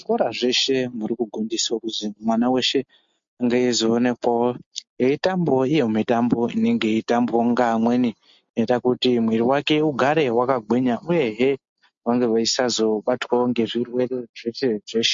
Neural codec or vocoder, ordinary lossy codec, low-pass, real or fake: none; MP3, 48 kbps; 7.2 kHz; real